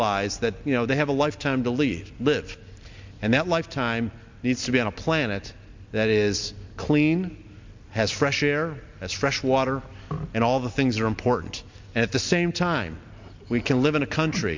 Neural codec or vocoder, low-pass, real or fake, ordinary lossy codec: none; 7.2 kHz; real; MP3, 64 kbps